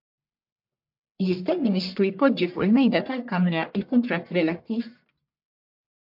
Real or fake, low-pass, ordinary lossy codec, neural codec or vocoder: fake; 5.4 kHz; MP3, 48 kbps; codec, 44.1 kHz, 1.7 kbps, Pupu-Codec